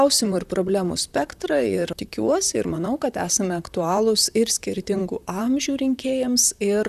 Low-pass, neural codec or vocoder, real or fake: 14.4 kHz; vocoder, 44.1 kHz, 128 mel bands, Pupu-Vocoder; fake